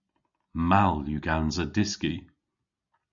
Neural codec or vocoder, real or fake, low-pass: none; real; 7.2 kHz